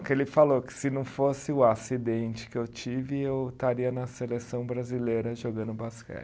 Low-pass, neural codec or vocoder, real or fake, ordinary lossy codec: none; none; real; none